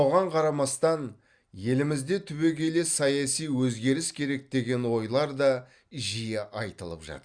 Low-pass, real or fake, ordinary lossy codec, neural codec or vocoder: 9.9 kHz; real; Opus, 64 kbps; none